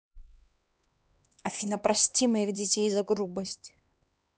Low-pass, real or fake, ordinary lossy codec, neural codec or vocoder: none; fake; none; codec, 16 kHz, 2 kbps, X-Codec, HuBERT features, trained on LibriSpeech